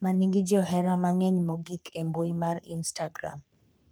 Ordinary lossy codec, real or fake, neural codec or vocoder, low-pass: none; fake; codec, 44.1 kHz, 3.4 kbps, Pupu-Codec; none